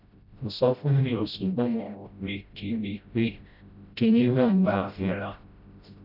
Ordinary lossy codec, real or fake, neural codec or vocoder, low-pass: none; fake; codec, 16 kHz, 0.5 kbps, FreqCodec, smaller model; 5.4 kHz